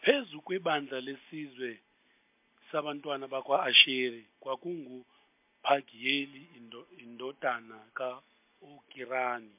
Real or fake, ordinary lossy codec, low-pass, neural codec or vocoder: real; MP3, 32 kbps; 3.6 kHz; none